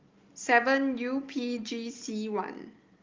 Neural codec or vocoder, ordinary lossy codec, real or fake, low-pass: none; Opus, 32 kbps; real; 7.2 kHz